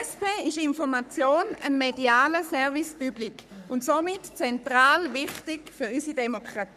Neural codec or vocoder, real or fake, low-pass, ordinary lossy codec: codec, 44.1 kHz, 3.4 kbps, Pupu-Codec; fake; 14.4 kHz; none